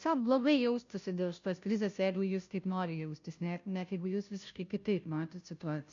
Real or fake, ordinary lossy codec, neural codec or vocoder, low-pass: fake; MP3, 64 kbps; codec, 16 kHz, 0.5 kbps, FunCodec, trained on Chinese and English, 25 frames a second; 7.2 kHz